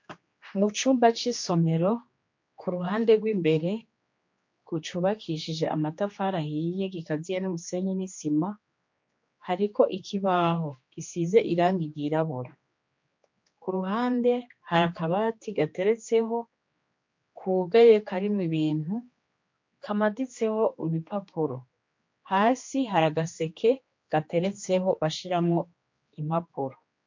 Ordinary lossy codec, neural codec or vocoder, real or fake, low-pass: MP3, 48 kbps; codec, 16 kHz, 2 kbps, X-Codec, HuBERT features, trained on general audio; fake; 7.2 kHz